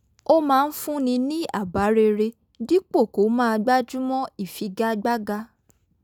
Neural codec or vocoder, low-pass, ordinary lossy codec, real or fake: none; 19.8 kHz; none; real